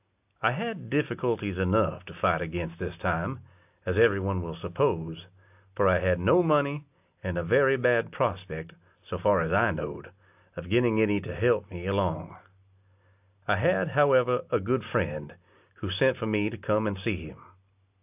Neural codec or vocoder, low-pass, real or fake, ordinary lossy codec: none; 3.6 kHz; real; AAC, 32 kbps